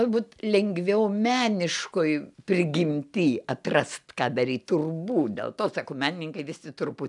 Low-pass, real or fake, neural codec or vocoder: 10.8 kHz; real; none